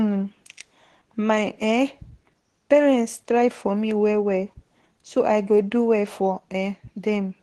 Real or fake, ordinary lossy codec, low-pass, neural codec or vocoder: fake; Opus, 16 kbps; 10.8 kHz; codec, 24 kHz, 0.9 kbps, WavTokenizer, medium speech release version 2